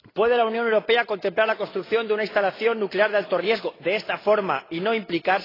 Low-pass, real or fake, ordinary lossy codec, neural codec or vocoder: 5.4 kHz; real; AAC, 24 kbps; none